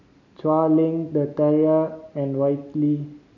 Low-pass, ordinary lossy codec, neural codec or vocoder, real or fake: 7.2 kHz; none; none; real